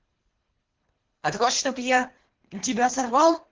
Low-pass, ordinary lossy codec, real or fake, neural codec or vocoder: 7.2 kHz; Opus, 16 kbps; fake; codec, 24 kHz, 3 kbps, HILCodec